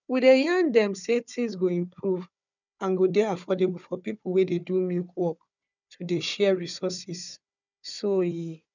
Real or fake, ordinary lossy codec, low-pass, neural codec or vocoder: fake; none; 7.2 kHz; codec, 16 kHz, 4 kbps, FunCodec, trained on Chinese and English, 50 frames a second